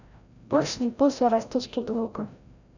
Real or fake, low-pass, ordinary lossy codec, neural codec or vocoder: fake; 7.2 kHz; none; codec, 16 kHz, 0.5 kbps, FreqCodec, larger model